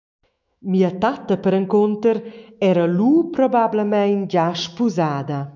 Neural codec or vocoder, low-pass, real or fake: autoencoder, 48 kHz, 128 numbers a frame, DAC-VAE, trained on Japanese speech; 7.2 kHz; fake